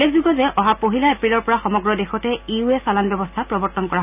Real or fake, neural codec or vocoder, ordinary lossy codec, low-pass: real; none; none; 3.6 kHz